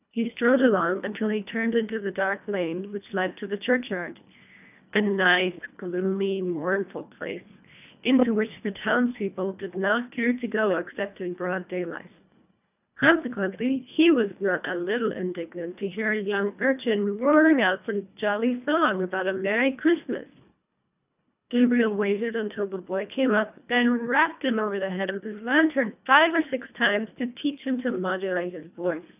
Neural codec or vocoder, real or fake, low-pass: codec, 24 kHz, 1.5 kbps, HILCodec; fake; 3.6 kHz